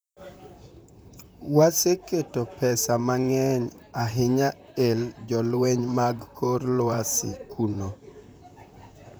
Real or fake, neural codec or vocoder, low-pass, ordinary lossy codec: fake; vocoder, 44.1 kHz, 128 mel bands, Pupu-Vocoder; none; none